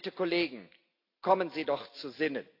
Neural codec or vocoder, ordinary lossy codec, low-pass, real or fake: none; AAC, 32 kbps; 5.4 kHz; real